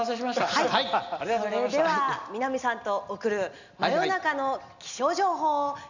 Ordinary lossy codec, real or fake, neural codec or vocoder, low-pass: none; real; none; 7.2 kHz